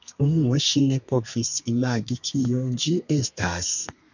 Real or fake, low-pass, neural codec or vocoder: fake; 7.2 kHz; codec, 32 kHz, 1.9 kbps, SNAC